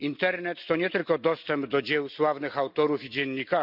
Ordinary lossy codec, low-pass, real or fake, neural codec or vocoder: none; 5.4 kHz; real; none